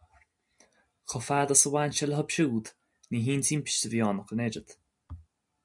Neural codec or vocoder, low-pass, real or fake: none; 10.8 kHz; real